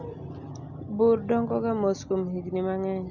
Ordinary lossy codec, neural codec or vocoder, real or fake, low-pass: none; none; real; 7.2 kHz